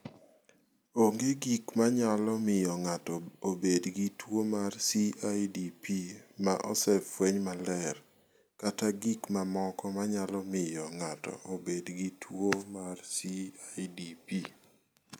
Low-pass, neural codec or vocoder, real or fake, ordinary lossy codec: none; none; real; none